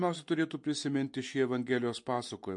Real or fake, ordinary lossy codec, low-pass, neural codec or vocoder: real; MP3, 48 kbps; 10.8 kHz; none